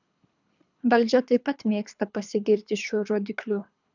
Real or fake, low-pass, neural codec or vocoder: fake; 7.2 kHz; codec, 24 kHz, 3 kbps, HILCodec